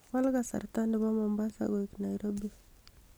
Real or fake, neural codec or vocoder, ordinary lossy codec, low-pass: real; none; none; none